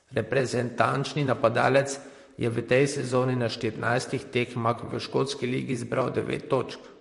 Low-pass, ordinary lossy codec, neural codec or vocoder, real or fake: 14.4 kHz; MP3, 48 kbps; vocoder, 44.1 kHz, 128 mel bands, Pupu-Vocoder; fake